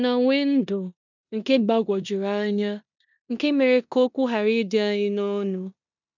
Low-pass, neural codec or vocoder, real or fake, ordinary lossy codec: 7.2 kHz; codec, 16 kHz in and 24 kHz out, 0.9 kbps, LongCat-Audio-Codec, four codebook decoder; fake; none